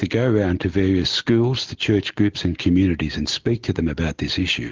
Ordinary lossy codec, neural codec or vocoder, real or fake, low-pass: Opus, 16 kbps; none; real; 7.2 kHz